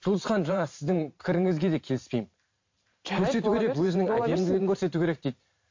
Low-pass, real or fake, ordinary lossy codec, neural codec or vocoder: 7.2 kHz; fake; MP3, 48 kbps; vocoder, 44.1 kHz, 128 mel bands every 512 samples, BigVGAN v2